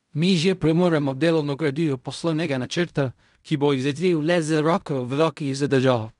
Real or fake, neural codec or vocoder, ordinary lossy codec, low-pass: fake; codec, 16 kHz in and 24 kHz out, 0.4 kbps, LongCat-Audio-Codec, fine tuned four codebook decoder; none; 10.8 kHz